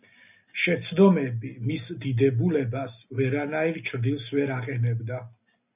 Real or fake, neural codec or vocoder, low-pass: real; none; 3.6 kHz